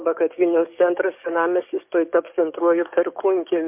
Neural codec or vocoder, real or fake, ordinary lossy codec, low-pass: codec, 44.1 kHz, 7.8 kbps, DAC; fake; MP3, 32 kbps; 3.6 kHz